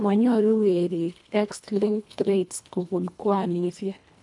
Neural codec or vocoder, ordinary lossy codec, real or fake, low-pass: codec, 24 kHz, 1.5 kbps, HILCodec; none; fake; 10.8 kHz